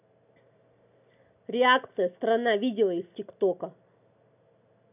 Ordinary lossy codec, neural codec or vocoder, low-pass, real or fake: none; none; 3.6 kHz; real